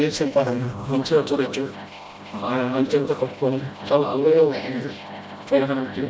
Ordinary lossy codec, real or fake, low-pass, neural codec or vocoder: none; fake; none; codec, 16 kHz, 0.5 kbps, FreqCodec, smaller model